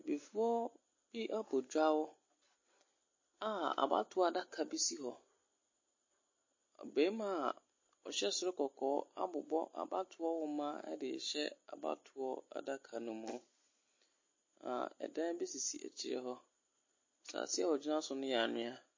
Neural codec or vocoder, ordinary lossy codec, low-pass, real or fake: none; MP3, 32 kbps; 7.2 kHz; real